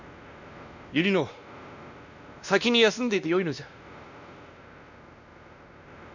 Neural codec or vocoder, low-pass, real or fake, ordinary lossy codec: codec, 16 kHz, 1 kbps, X-Codec, WavLM features, trained on Multilingual LibriSpeech; 7.2 kHz; fake; none